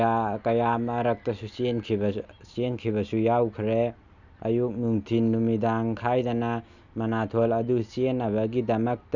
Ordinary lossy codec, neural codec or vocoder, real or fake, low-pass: none; none; real; 7.2 kHz